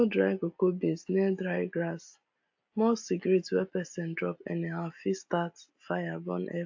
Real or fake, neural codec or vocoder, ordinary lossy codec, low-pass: real; none; AAC, 48 kbps; 7.2 kHz